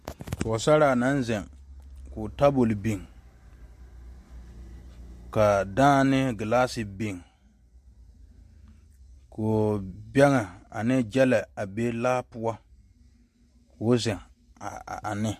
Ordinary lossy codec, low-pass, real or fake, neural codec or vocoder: MP3, 64 kbps; 14.4 kHz; real; none